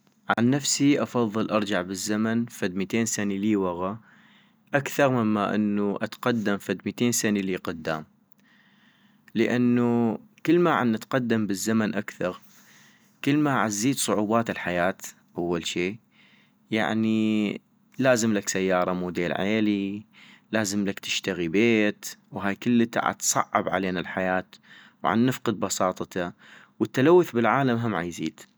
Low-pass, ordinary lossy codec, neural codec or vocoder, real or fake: none; none; none; real